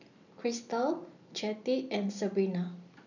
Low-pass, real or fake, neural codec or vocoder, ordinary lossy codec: 7.2 kHz; real; none; none